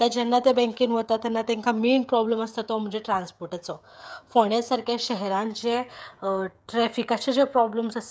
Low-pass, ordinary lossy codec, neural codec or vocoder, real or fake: none; none; codec, 16 kHz, 16 kbps, FreqCodec, smaller model; fake